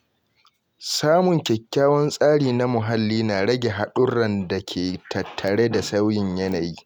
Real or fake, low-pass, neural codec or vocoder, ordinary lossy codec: real; none; none; none